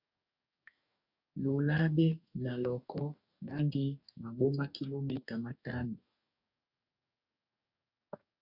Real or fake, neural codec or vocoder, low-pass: fake; codec, 44.1 kHz, 2.6 kbps, DAC; 5.4 kHz